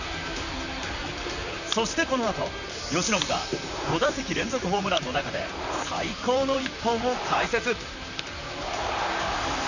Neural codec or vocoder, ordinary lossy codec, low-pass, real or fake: vocoder, 44.1 kHz, 128 mel bands, Pupu-Vocoder; none; 7.2 kHz; fake